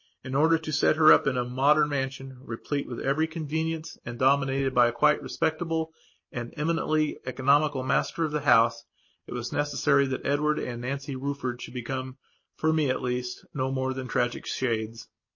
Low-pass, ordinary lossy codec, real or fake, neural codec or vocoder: 7.2 kHz; MP3, 32 kbps; real; none